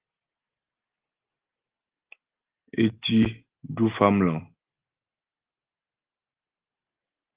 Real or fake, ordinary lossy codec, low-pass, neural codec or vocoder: real; Opus, 16 kbps; 3.6 kHz; none